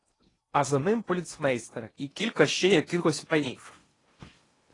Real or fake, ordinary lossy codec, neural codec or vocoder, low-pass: fake; AAC, 32 kbps; codec, 16 kHz in and 24 kHz out, 0.8 kbps, FocalCodec, streaming, 65536 codes; 10.8 kHz